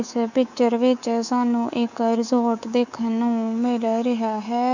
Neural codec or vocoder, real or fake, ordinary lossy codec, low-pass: codec, 24 kHz, 3.1 kbps, DualCodec; fake; none; 7.2 kHz